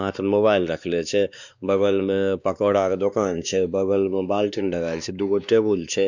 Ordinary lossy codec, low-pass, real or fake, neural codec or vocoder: none; 7.2 kHz; fake; codec, 16 kHz, 2 kbps, X-Codec, WavLM features, trained on Multilingual LibriSpeech